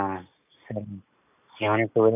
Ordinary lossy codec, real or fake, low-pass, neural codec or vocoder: none; real; 3.6 kHz; none